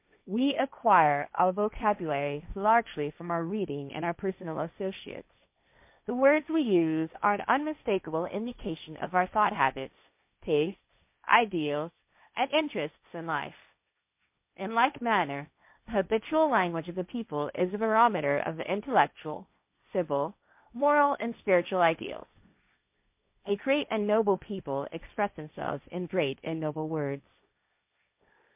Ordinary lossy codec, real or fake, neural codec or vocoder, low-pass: MP3, 32 kbps; fake; codec, 16 kHz, 1.1 kbps, Voila-Tokenizer; 3.6 kHz